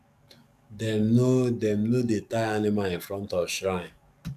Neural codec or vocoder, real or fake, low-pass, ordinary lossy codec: codec, 44.1 kHz, 7.8 kbps, DAC; fake; 14.4 kHz; none